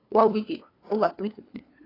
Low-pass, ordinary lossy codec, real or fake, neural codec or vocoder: 5.4 kHz; AAC, 24 kbps; fake; codec, 16 kHz, 2 kbps, FunCodec, trained on LibriTTS, 25 frames a second